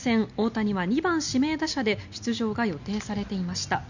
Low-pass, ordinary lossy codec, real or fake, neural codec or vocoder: 7.2 kHz; none; real; none